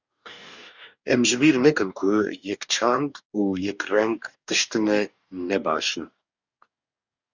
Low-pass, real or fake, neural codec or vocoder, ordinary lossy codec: 7.2 kHz; fake; codec, 44.1 kHz, 2.6 kbps, DAC; Opus, 64 kbps